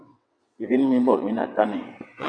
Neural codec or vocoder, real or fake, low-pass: vocoder, 22.05 kHz, 80 mel bands, WaveNeXt; fake; 9.9 kHz